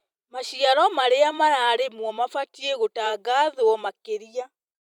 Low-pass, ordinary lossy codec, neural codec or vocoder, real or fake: 19.8 kHz; none; vocoder, 44.1 kHz, 128 mel bands every 256 samples, BigVGAN v2; fake